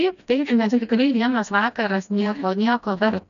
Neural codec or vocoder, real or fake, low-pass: codec, 16 kHz, 1 kbps, FreqCodec, smaller model; fake; 7.2 kHz